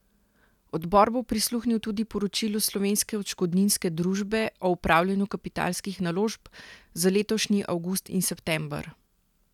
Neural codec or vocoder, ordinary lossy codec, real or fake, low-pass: none; none; real; 19.8 kHz